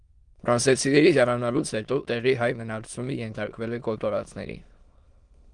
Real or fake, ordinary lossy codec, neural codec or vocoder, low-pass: fake; Opus, 24 kbps; autoencoder, 22.05 kHz, a latent of 192 numbers a frame, VITS, trained on many speakers; 9.9 kHz